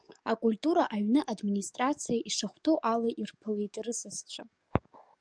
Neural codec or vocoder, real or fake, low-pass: codec, 44.1 kHz, 7.8 kbps, DAC; fake; 9.9 kHz